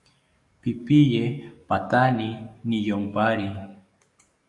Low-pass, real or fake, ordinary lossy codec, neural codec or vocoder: 10.8 kHz; fake; AAC, 64 kbps; codec, 44.1 kHz, 7.8 kbps, DAC